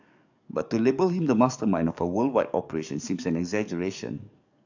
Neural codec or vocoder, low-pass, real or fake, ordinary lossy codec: codec, 44.1 kHz, 7.8 kbps, DAC; 7.2 kHz; fake; none